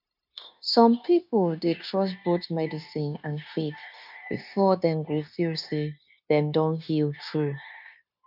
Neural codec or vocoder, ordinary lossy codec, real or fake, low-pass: codec, 16 kHz, 0.9 kbps, LongCat-Audio-Codec; none; fake; 5.4 kHz